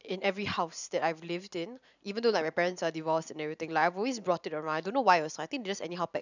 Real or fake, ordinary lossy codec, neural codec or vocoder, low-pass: fake; none; vocoder, 44.1 kHz, 128 mel bands every 512 samples, BigVGAN v2; 7.2 kHz